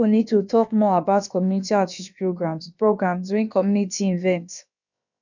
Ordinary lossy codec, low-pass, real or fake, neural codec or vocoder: none; 7.2 kHz; fake; codec, 16 kHz, about 1 kbps, DyCAST, with the encoder's durations